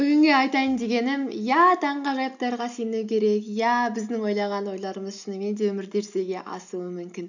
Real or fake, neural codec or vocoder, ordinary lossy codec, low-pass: real; none; none; 7.2 kHz